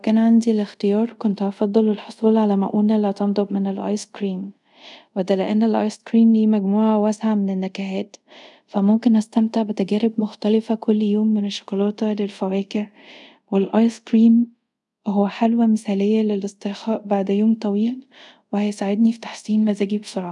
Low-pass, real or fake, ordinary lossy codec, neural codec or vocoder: 10.8 kHz; fake; none; codec, 24 kHz, 0.5 kbps, DualCodec